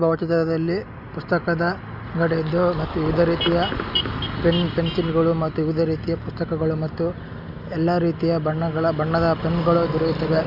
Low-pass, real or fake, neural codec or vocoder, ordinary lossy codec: 5.4 kHz; real; none; none